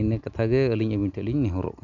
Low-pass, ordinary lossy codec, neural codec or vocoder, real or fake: 7.2 kHz; none; none; real